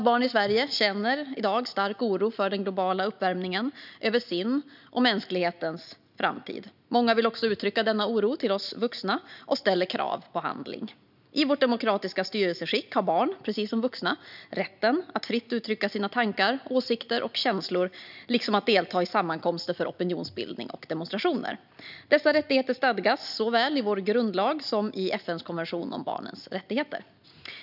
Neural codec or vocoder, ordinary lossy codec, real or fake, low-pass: none; none; real; 5.4 kHz